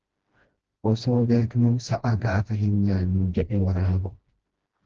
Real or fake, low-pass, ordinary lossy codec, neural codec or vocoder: fake; 7.2 kHz; Opus, 16 kbps; codec, 16 kHz, 1 kbps, FreqCodec, smaller model